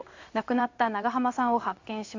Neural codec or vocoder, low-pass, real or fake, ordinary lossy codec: codec, 16 kHz in and 24 kHz out, 1 kbps, XY-Tokenizer; 7.2 kHz; fake; AAC, 48 kbps